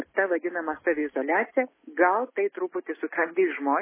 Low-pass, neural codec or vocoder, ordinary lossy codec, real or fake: 3.6 kHz; none; MP3, 16 kbps; real